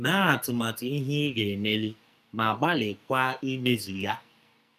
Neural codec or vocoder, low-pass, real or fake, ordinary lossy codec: codec, 44.1 kHz, 2.6 kbps, SNAC; 14.4 kHz; fake; none